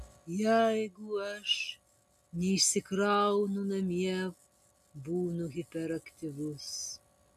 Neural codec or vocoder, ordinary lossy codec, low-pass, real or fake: none; AAC, 96 kbps; 14.4 kHz; real